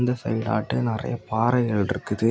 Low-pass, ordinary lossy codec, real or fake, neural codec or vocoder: none; none; real; none